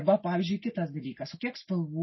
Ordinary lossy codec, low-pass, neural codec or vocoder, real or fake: MP3, 24 kbps; 7.2 kHz; none; real